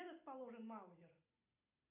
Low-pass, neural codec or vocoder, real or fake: 3.6 kHz; none; real